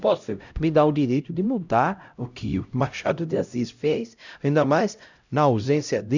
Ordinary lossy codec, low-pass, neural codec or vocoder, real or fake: none; 7.2 kHz; codec, 16 kHz, 0.5 kbps, X-Codec, HuBERT features, trained on LibriSpeech; fake